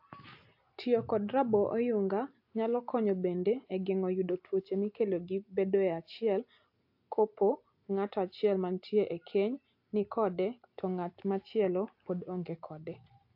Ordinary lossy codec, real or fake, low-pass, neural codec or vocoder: AAC, 48 kbps; real; 5.4 kHz; none